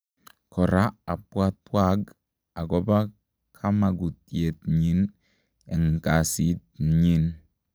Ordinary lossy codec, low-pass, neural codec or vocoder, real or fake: none; none; none; real